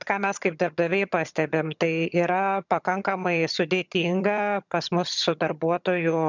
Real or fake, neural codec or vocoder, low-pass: fake; vocoder, 22.05 kHz, 80 mel bands, HiFi-GAN; 7.2 kHz